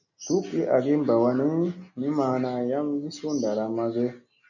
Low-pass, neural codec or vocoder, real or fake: 7.2 kHz; none; real